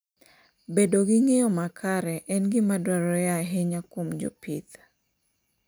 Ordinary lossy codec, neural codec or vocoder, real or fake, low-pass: none; none; real; none